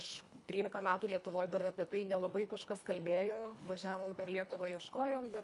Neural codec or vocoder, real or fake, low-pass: codec, 24 kHz, 1.5 kbps, HILCodec; fake; 10.8 kHz